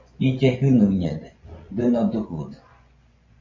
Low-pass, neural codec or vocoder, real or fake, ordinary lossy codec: 7.2 kHz; vocoder, 24 kHz, 100 mel bands, Vocos; fake; MP3, 64 kbps